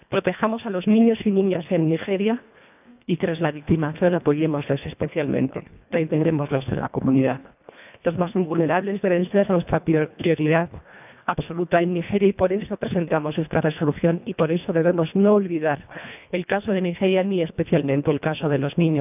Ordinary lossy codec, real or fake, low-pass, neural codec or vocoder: none; fake; 3.6 kHz; codec, 24 kHz, 1.5 kbps, HILCodec